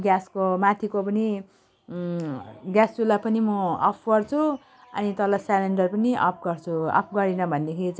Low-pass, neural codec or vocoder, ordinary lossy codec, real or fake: none; none; none; real